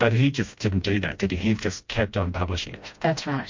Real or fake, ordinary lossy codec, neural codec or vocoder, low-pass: fake; MP3, 48 kbps; codec, 16 kHz, 1 kbps, FreqCodec, smaller model; 7.2 kHz